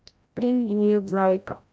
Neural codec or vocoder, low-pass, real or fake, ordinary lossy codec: codec, 16 kHz, 0.5 kbps, FreqCodec, larger model; none; fake; none